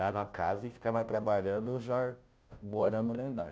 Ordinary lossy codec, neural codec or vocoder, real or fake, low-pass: none; codec, 16 kHz, 0.5 kbps, FunCodec, trained on Chinese and English, 25 frames a second; fake; none